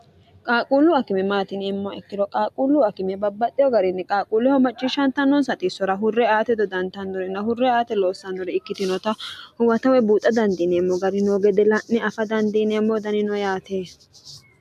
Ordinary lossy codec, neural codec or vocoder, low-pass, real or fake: AAC, 96 kbps; none; 14.4 kHz; real